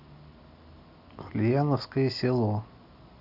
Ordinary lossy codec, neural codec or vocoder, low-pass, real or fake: none; none; 5.4 kHz; real